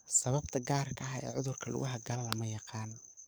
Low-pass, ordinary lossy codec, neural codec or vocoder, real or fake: none; none; codec, 44.1 kHz, 7.8 kbps, DAC; fake